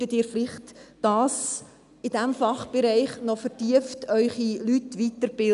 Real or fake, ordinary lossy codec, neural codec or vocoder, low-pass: real; none; none; 10.8 kHz